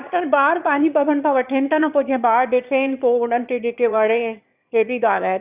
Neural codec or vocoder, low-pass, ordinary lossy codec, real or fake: autoencoder, 22.05 kHz, a latent of 192 numbers a frame, VITS, trained on one speaker; 3.6 kHz; Opus, 64 kbps; fake